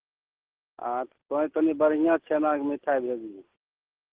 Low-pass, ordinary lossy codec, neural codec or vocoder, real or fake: 3.6 kHz; Opus, 16 kbps; none; real